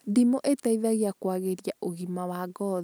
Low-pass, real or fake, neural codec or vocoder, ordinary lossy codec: none; real; none; none